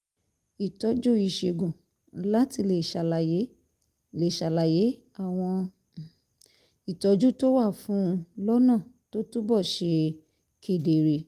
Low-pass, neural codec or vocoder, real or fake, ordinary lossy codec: 14.4 kHz; none; real; Opus, 32 kbps